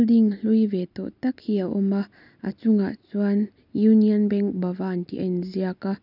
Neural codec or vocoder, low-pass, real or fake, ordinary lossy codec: none; 5.4 kHz; real; none